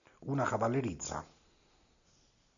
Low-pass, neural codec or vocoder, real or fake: 7.2 kHz; none; real